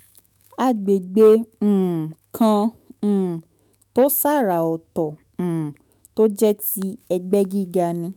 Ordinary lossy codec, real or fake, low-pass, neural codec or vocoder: none; fake; none; autoencoder, 48 kHz, 128 numbers a frame, DAC-VAE, trained on Japanese speech